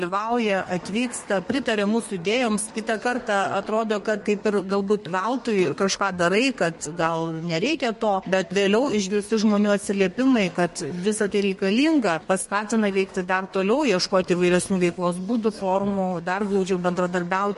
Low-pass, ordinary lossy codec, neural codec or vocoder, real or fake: 14.4 kHz; MP3, 48 kbps; codec, 32 kHz, 1.9 kbps, SNAC; fake